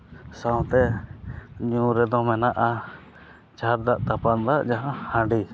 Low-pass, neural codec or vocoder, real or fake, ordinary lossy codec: none; none; real; none